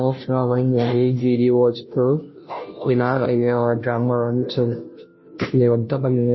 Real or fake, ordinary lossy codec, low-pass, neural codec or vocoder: fake; MP3, 24 kbps; 7.2 kHz; codec, 16 kHz, 0.5 kbps, FunCodec, trained on Chinese and English, 25 frames a second